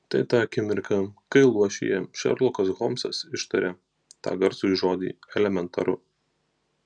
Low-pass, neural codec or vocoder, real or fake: 9.9 kHz; none; real